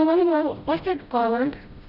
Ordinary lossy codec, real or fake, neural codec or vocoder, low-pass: none; fake; codec, 16 kHz, 0.5 kbps, FreqCodec, smaller model; 5.4 kHz